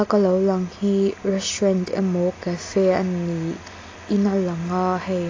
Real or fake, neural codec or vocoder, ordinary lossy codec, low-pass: real; none; AAC, 32 kbps; 7.2 kHz